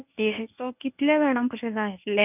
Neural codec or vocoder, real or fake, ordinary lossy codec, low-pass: codec, 24 kHz, 0.9 kbps, WavTokenizer, medium speech release version 1; fake; none; 3.6 kHz